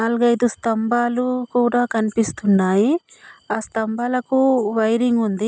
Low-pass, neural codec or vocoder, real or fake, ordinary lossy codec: none; none; real; none